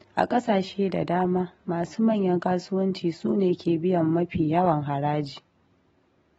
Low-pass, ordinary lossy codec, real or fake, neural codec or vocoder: 10.8 kHz; AAC, 24 kbps; real; none